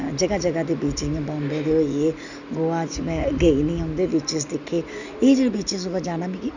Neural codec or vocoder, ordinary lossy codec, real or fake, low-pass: none; none; real; 7.2 kHz